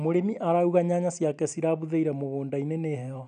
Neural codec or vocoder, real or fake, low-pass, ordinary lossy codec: none; real; 9.9 kHz; none